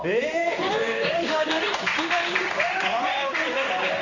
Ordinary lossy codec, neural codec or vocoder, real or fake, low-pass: none; codec, 16 kHz in and 24 kHz out, 1 kbps, XY-Tokenizer; fake; 7.2 kHz